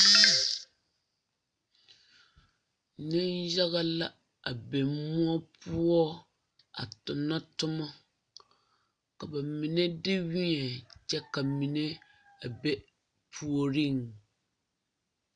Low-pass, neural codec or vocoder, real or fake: 9.9 kHz; none; real